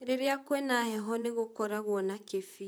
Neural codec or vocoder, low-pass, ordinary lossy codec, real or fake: vocoder, 44.1 kHz, 128 mel bands, Pupu-Vocoder; none; none; fake